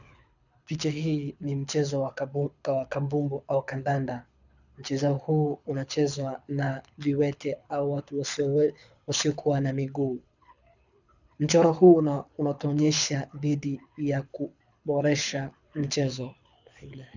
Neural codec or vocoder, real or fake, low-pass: codec, 24 kHz, 6 kbps, HILCodec; fake; 7.2 kHz